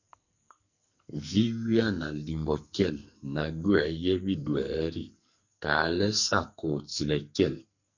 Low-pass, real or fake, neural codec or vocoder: 7.2 kHz; fake; codec, 44.1 kHz, 2.6 kbps, SNAC